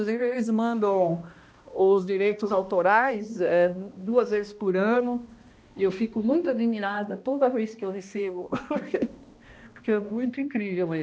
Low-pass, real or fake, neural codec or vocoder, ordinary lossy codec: none; fake; codec, 16 kHz, 1 kbps, X-Codec, HuBERT features, trained on balanced general audio; none